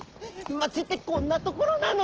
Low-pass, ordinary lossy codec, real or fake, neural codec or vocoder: 7.2 kHz; Opus, 16 kbps; real; none